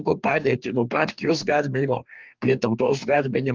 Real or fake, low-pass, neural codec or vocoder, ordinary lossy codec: fake; 7.2 kHz; codec, 44.1 kHz, 2.6 kbps, DAC; Opus, 24 kbps